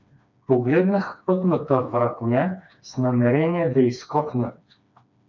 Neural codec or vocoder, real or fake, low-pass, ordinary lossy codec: codec, 16 kHz, 2 kbps, FreqCodec, smaller model; fake; 7.2 kHz; MP3, 64 kbps